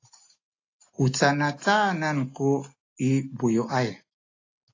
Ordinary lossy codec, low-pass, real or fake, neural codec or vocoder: AAC, 32 kbps; 7.2 kHz; real; none